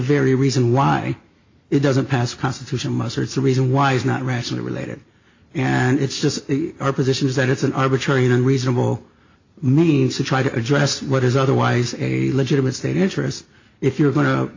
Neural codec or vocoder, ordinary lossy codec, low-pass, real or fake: none; AAC, 48 kbps; 7.2 kHz; real